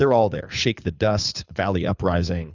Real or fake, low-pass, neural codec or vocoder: fake; 7.2 kHz; codec, 24 kHz, 6 kbps, HILCodec